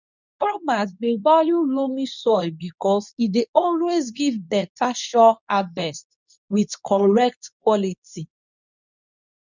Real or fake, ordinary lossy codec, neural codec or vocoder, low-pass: fake; none; codec, 24 kHz, 0.9 kbps, WavTokenizer, medium speech release version 2; 7.2 kHz